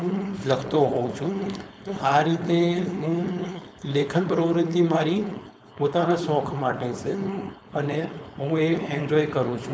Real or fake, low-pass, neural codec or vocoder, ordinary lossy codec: fake; none; codec, 16 kHz, 4.8 kbps, FACodec; none